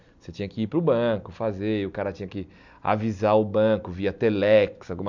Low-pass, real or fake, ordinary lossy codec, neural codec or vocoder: 7.2 kHz; real; MP3, 64 kbps; none